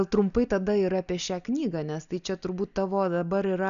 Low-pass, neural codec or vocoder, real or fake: 7.2 kHz; none; real